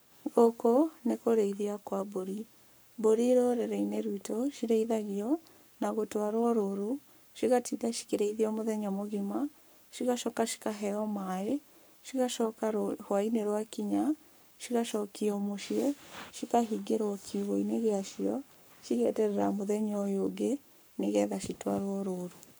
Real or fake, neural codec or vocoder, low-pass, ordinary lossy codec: fake; codec, 44.1 kHz, 7.8 kbps, Pupu-Codec; none; none